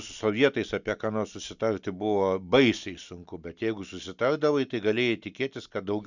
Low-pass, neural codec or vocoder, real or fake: 7.2 kHz; none; real